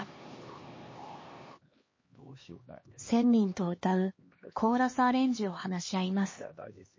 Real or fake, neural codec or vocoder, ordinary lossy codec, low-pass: fake; codec, 16 kHz, 2 kbps, X-Codec, HuBERT features, trained on LibriSpeech; MP3, 32 kbps; 7.2 kHz